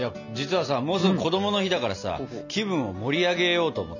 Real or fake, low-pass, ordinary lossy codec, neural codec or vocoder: real; 7.2 kHz; none; none